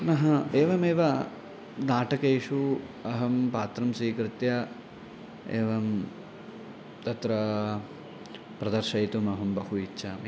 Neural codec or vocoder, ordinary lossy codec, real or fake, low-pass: none; none; real; none